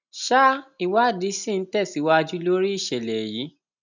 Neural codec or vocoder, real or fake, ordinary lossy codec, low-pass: none; real; none; 7.2 kHz